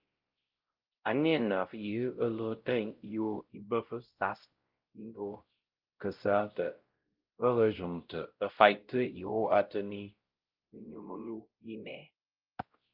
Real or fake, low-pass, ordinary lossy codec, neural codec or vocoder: fake; 5.4 kHz; Opus, 32 kbps; codec, 16 kHz, 0.5 kbps, X-Codec, WavLM features, trained on Multilingual LibriSpeech